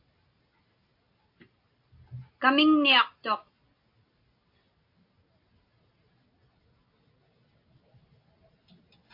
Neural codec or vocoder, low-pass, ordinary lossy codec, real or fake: none; 5.4 kHz; AAC, 32 kbps; real